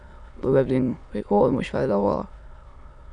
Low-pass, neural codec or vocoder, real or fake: 9.9 kHz; autoencoder, 22.05 kHz, a latent of 192 numbers a frame, VITS, trained on many speakers; fake